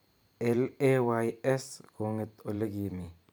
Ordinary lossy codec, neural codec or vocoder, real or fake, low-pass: none; vocoder, 44.1 kHz, 128 mel bands every 512 samples, BigVGAN v2; fake; none